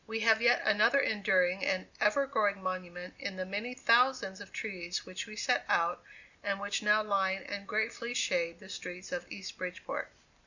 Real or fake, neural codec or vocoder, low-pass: real; none; 7.2 kHz